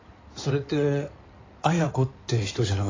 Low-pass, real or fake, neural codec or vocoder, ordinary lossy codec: 7.2 kHz; fake; codec, 16 kHz in and 24 kHz out, 2.2 kbps, FireRedTTS-2 codec; AAC, 32 kbps